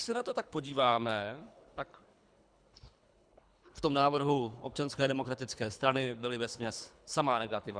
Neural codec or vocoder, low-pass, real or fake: codec, 24 kHz, 3 kbps, HILCodec; 9.9 kHz; fake